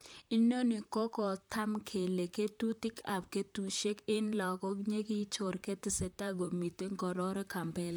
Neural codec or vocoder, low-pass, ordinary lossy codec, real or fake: vocoder, 44.1 kHz, 128 mel bands, Pupu-Vocoder; none; none; fake